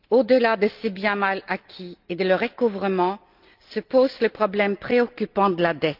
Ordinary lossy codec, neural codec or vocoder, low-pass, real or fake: Opus, 32 kbps; none; 5.4 kHz; real